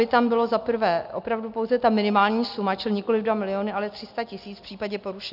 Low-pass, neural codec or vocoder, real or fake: 5.4 kHz; none; real